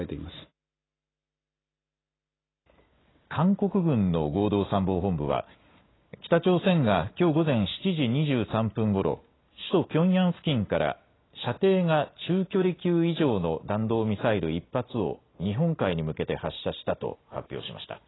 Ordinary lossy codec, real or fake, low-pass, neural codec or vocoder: AAC, 16 kbps; real; 7.2 kHz; none